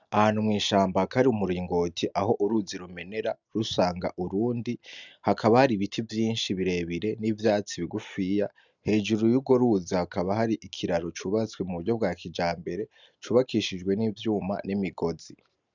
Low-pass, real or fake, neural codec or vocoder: 7.2 kHz; real; none